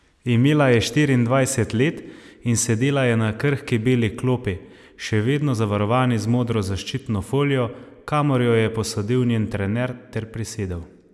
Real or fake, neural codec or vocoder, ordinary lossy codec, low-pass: real; none; none; none